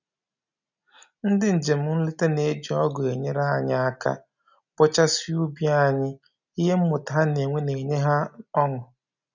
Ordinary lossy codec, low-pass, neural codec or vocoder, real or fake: none; 7.2 kHz; none; real